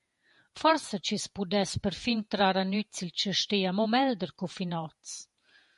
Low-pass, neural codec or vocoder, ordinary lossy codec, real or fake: 14.4 kHz; vocoder, 48 kHz, 128 mel bands, Vocos; MP3, 48 kbps; fake